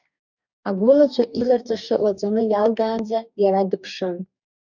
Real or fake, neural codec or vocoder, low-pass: fake; codec, 44.1 kHz, 2.6 kbps, DAC; 7.2 kHz